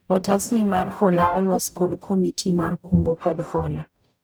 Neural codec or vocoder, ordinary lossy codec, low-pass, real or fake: codec, 44.1 kHz, 0.9 kbps, DAC; none; none; fake